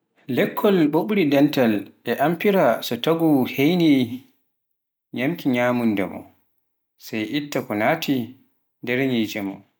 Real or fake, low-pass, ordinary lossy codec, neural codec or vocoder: real; none; none; none